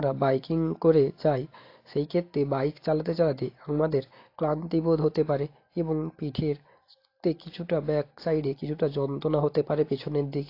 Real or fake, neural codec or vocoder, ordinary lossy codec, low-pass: real; none; AAC, 32 kbps; 5.4 kHz